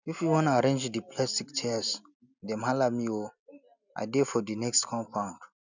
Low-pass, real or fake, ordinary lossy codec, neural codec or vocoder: 7.2 kHz; real; none; none